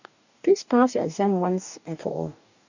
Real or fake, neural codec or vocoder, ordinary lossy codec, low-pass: fake; codec, 44.1 kHz, 2.6 kbps, DAC; none; 7.2 kHz